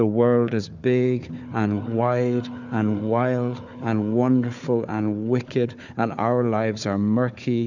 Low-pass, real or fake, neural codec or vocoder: 7.2 kHz; fake; codec, 16 kHz, 4 kbps, FunCodec, trained on Chinese and English, 50 frames a second